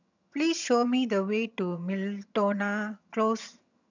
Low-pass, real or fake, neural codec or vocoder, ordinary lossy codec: 7.2 kHz; fake; vocoder, 22.05 kHz, 80 mel bands, HiFi-GAN; none